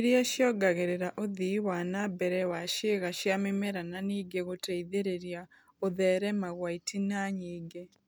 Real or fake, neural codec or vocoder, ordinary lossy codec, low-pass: real; none; none; none